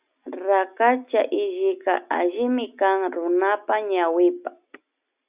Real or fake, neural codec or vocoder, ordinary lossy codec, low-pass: real; none; Opus, 64 kbps; 3.6 kHz